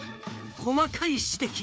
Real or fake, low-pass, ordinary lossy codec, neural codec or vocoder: fake; none; none; codec, 16 kHz, 4 kbps, FreqCodec, larger model